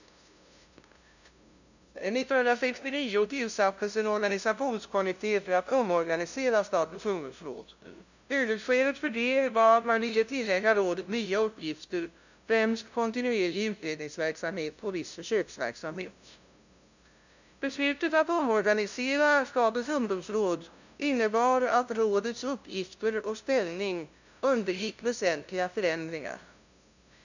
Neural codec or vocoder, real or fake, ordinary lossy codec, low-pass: codec, 16 kHz, 0.5 kbps, FunCodec, trained on LibriTTS, 25 frames a second; fake; none; 7.2 kHz